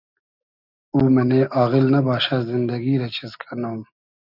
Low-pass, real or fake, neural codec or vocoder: 5.4 kHz; real; none